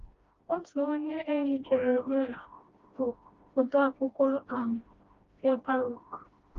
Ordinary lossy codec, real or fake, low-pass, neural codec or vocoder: Opus, 32 kbps; fake; 7.2 kHz; codec, 16 kHz, 1 kbps, FreqCodec, smaller model